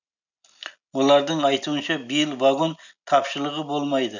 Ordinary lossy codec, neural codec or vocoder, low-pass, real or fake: none; none; 7.2 kHz; real